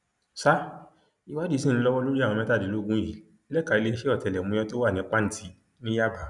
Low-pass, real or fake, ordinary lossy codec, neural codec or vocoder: 10.8 kHz; real; none; none